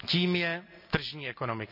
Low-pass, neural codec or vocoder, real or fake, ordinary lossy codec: 5.4 kHz; none; real; none